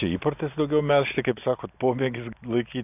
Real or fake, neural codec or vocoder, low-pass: real; none; 3.6 kHz